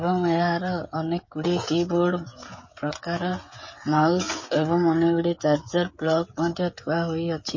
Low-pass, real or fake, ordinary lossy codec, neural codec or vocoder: 7.2 kHz; fake; MP3, 32 kbps; codec, 16 kHz, 16 kbps, FreqCodec, smaller model